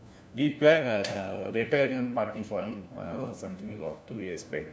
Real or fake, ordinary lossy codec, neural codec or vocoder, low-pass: fake; none; codec, 16 kHz, 1 kbps, FunCodec, trained on LibriTTS, 50 frames a second; none